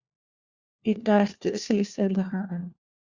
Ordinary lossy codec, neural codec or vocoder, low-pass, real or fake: Opus, 64 kbps; codec, 16 kHz, 1 kbps, FunCodec, trained on LibriTTS, 50 frames a second; 7.2 kHz; fake